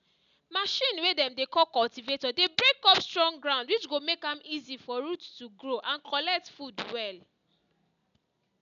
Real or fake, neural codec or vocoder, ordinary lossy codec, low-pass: real; none; MP3, 96 kbps; 7.2 kHz